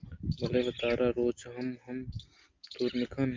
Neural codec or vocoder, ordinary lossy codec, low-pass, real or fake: none; Opus, 32 kbps; 7.2 kHz; real